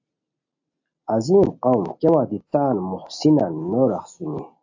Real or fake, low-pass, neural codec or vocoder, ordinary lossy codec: real; 7.2 kHz; none; AAC, 48 kbps